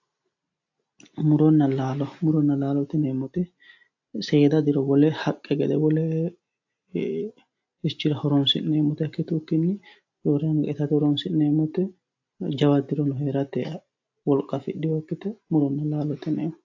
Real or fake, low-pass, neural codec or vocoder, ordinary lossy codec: real; 7.2 kHz; none; AAC, 48 kbps